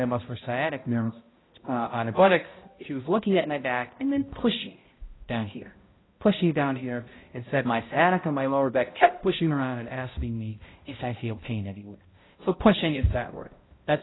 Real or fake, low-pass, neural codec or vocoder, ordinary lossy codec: fake; 7.2 kHz; codec, 16 kHz, 0.5 kbps, X-Codec, HuBERT features, trained on balanced general audio; AAC, 16 kbps